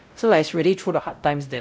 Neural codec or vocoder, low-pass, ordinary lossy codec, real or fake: codec, 16 kHz, 0.5 kbps, X-Codec, WavLM features, trained on Multilingual LibriSpeech; none; none; fake